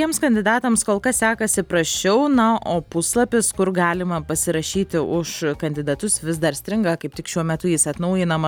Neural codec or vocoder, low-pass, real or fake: none; 19.8 kHz; real